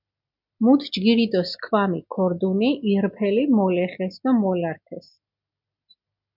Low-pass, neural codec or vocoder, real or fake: 5.4 kHz; none; real